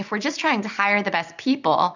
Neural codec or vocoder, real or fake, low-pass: vocoder, 44.1 kHz, 128 mel bands every 256 samples, BigVGAN v2; fake; 7.2 kHz